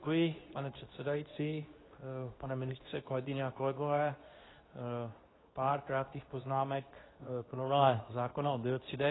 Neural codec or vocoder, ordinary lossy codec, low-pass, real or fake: codec, 24 kHz, 0.9 kbps, WavTokenizer, medium speech release version 2; AAC, 16 kbps; 7.2 kHz; fake